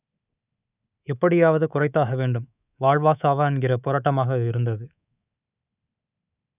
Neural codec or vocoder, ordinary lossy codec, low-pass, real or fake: codec, 24 kHz, 3.1 kbps, DualCodec; none; 3.6 kHz; fake